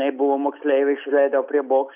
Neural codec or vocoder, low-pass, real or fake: none; 3.6 kHz; real